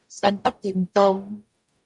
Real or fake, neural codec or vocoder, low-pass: fake; codec, 44.1 kHz, 0.9 kbps, DAC; 10.8 kHz